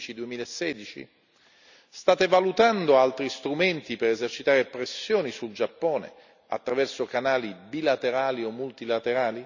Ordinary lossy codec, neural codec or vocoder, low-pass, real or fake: none; none; 7.2 kHz; real